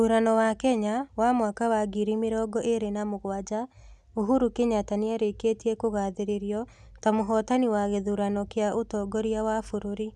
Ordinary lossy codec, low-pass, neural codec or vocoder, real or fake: none; none; none; real